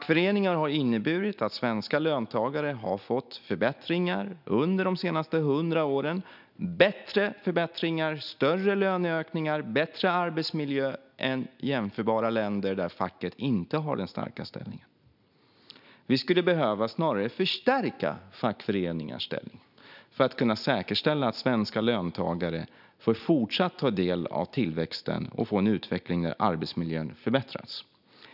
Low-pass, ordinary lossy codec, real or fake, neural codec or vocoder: 5.4 kHz; none; real; none